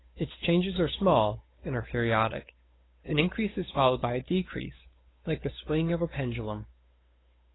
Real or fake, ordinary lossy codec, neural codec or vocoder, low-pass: fake; AAC, 16 kbps; codec, 16 kHz, 8 kbps, FunCodec, trained on Chinese and English, 25 frames a second; 7.2 kHz